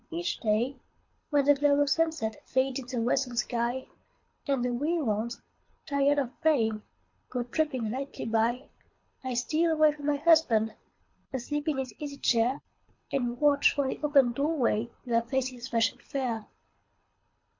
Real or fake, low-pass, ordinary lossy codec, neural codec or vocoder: fake; 7.2 kHz; MP3, 48 kbps; codec, 24 kHz, 6 kbps, HILCodec